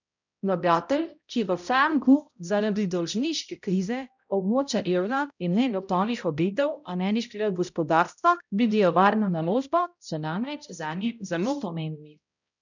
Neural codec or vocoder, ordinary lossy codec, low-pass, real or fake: codec, 16 kHz, 0.5 kbps, X-Codec, HuBERT features, trained on balanced general audio; none; 7.2 kHz; fake